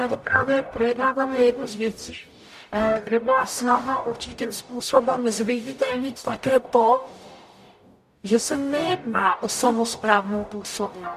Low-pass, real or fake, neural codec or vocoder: 14.4 kHz; fake; codec, 44.1 kHz, 0.9 kbps, DAC